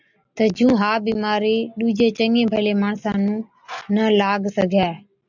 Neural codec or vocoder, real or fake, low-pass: none; real; 7.2 kHz